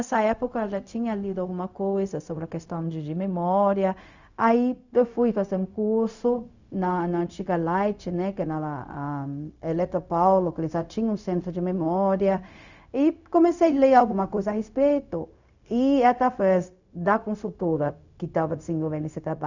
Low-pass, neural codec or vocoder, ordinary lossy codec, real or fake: 7.2 kHz; codec, 16 kHz, 0.4 kbps, LongCat-Audio-Codec; none; fake